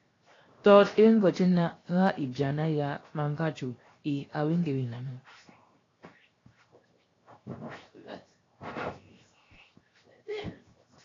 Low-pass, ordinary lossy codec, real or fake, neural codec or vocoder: 7.2 kHz; AAC, 32 kbps; fake; codec, 16 kHz, 0.7 kbps, FocalCodec